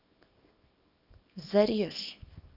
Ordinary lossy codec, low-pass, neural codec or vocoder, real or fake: none; 5.4 kHz; codec, 24 kHz, 0.9 kbps, WavTokenizer, small release; fake